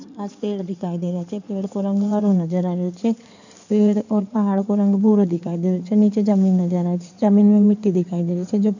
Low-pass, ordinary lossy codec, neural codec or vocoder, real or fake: 7.2 kHz; none; codec, 16 kHz in and 24 kHz out, 2.2 kbps, FireRedTTS-2 codec; fake